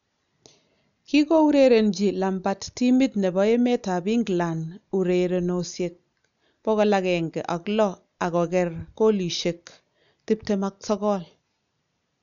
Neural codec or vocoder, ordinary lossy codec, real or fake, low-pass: none; none; real; 7.2 kHz